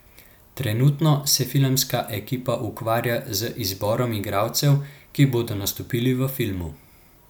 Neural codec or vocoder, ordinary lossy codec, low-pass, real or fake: none; none; none; real